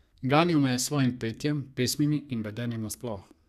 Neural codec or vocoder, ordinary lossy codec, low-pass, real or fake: codec, 32 kHz, 1.9 kbps, SNAC; none; 14.4 kHz; fake